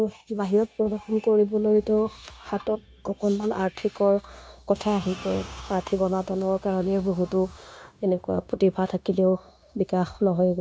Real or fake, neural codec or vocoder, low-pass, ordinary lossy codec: fake; codec, 16 kHz, 0.9 kbps, LongCat-Audio-Codec; none; none